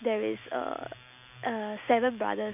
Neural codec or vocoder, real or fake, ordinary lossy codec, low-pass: none; real; none; 3.6 kHz